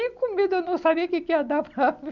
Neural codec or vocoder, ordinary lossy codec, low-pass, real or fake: none; none; 7.2 kHz; real